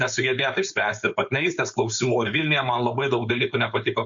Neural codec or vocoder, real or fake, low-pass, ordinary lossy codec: codec, 16 kHz, 4.8 kbps, FACodec; fake; 7.2 kHz; Opus, 64 kbps